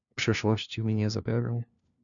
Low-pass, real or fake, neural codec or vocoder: 7.2 kHz; fake; codec, 16 kHz, 1 kbps, FunCodec, trained on LibriTTS, 50 frames a second